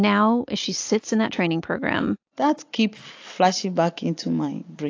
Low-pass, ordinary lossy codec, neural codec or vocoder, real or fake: 7.2 kHz; AAC, 48 kbps; none; real